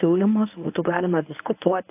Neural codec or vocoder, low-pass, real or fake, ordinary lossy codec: codec, 24 kHz, 0.9 kbps, WavTokenizer, medium speech release version 1; 3.6 kHz; fake; AAC, 32 kbps